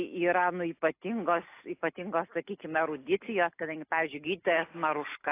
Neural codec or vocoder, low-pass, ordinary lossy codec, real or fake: none; 3.6 kHz; AAC, 24 kbps; real